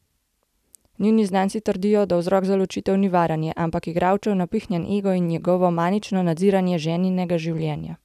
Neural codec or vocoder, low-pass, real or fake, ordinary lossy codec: none; 14.4 kHz; real; none